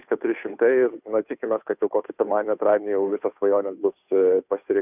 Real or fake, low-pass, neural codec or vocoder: fake; 3.6 kHz; codec, 16 kHz, 2 kbps, FunCodec, trained on Chinese and English, 25 frames a second